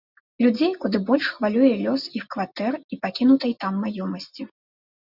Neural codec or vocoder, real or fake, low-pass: none; real; 5.4 kHz